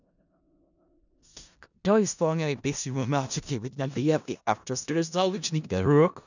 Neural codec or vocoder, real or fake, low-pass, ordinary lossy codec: codec, 16 kHz in and 24 kHz out, 0.4 kbps, LongCat-Audio-Codec, four codebook decoder; fake; 7.2 kHz; none